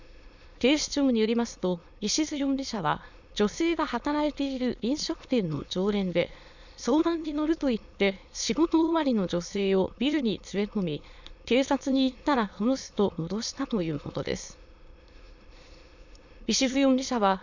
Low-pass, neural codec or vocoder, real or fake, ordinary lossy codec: 7.2 kHz; autoencoder, 22.05 kHz, a latent of 192 numbers a frame, VITS, trained on many speakers; fake; none